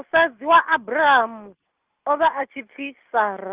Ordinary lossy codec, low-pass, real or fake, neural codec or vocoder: Opus, 32 kbps; 3.6 kHz; real; none